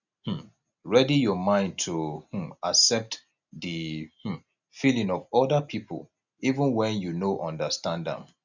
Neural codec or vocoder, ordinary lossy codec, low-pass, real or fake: none; none; 7.2 kHz; real